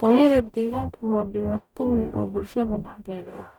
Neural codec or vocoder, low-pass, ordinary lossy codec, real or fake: codec, 44.1 kHz, 0.9 kbps, DAC; 19.8 kHz; none; fake